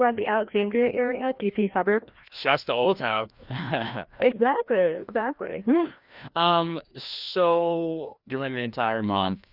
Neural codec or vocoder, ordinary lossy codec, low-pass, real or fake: codec, 16 kHz, 1 kbps, FreqCodec, larger model; Opus, 64 kbps; 5.4 kHz; fake